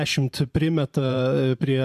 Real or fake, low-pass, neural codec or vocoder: fake; 14.4 kHz; vocoder, 44.1 kHz, 128 mel bands every 512 samples, BigVGAN v2